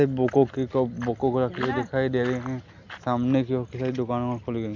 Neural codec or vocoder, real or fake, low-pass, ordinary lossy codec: none; real; 7.2 kHz; MP3, 64 kbps